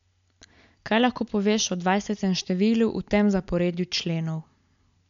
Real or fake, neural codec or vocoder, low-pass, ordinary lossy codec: real; none; 7.2 kHz; MP3, 64 kbps